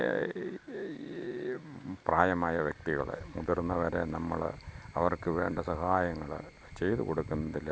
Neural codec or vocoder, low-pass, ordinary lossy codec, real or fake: none; none; none; real